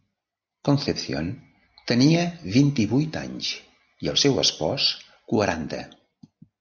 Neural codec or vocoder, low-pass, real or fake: none; 7.2 kHz; real